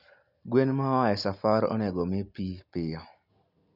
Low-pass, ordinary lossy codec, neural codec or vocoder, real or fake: 5.4 kHz; none; none; real